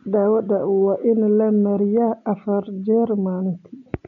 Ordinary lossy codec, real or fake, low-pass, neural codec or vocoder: none; real; 7.2 kHz; none